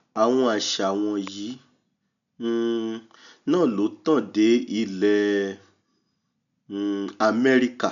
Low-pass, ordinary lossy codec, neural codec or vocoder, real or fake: 7.2 kHz; none; none; real